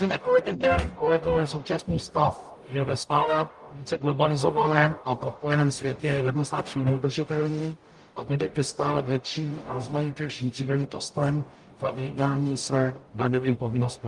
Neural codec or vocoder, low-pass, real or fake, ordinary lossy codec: codec, 44.1 kHz, 0.9 kbps, DAC; 10.8 kHz; fake; Opus, 32 kbps